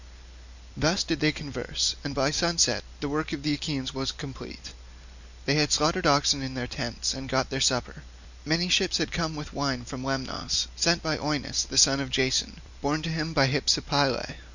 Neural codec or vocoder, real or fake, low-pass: none; real; 7.2 kHz